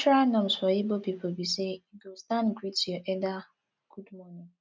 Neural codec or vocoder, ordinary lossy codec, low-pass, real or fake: none; none; none; real